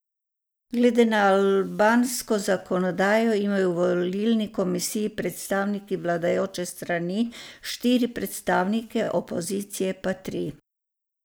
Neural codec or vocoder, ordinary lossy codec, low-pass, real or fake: none; none; none; real